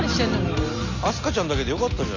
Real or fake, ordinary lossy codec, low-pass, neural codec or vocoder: real; none; 7.2 kHz; none